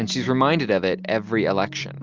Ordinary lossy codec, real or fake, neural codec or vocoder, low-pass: Opus, 32 kbps; real; none; 7.2 kHz